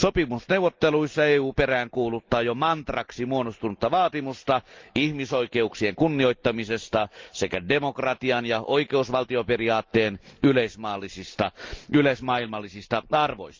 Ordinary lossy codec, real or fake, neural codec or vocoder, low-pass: Opus, 32 kbps; real; none; 7.2 kHz